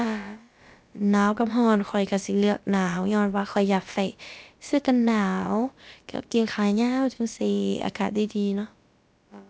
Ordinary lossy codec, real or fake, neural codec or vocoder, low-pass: none; fake; codec, 16 kHz, about 1 kbps, DyCAST, with the encoder's durations; none